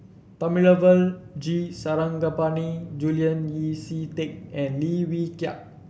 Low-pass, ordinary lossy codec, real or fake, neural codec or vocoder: none; none; real; none